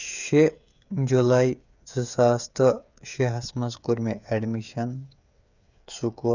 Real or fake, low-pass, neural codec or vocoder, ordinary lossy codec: fake; 7.2 kHz; codec, 16 kHz, 8 kbps, FreqCodec, smaller model; none